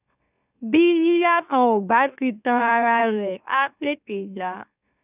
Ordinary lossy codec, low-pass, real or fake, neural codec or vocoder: none; 3.6 kHz; fake; autoencoder, 44.1 kHz, a latent of 192 numbers a frame, MeloTTS